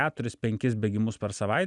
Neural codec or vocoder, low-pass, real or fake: none; 10.8 kHz; real